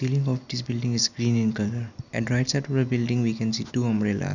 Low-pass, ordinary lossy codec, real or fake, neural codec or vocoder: 7.2 kHz; none; real; none